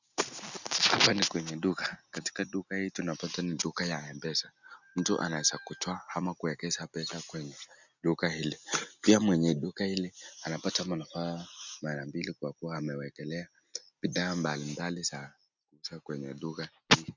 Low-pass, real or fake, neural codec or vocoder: 7.2 kHz; real; none